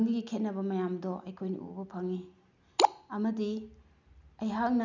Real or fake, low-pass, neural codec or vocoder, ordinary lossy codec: real; 7.2 kHz; none; none